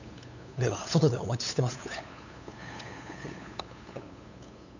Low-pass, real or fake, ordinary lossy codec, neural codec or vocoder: 7.2 kHz; fake; none; codec, 16 kHz, 8 kbps, FunCodec, trained on LibriTTS, 25 frames a second